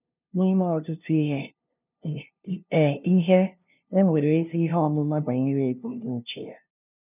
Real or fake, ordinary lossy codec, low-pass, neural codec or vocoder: fake; none; 3.6 kHz; codec, 16 kHz, 0.5 kbps, FunCodec, trained on LibriTTS, 25 frames a second